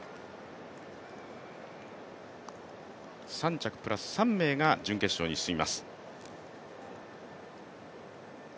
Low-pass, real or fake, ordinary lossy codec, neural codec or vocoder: none; real; none; none